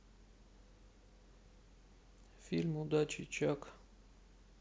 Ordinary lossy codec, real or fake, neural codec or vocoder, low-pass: none; real; none; none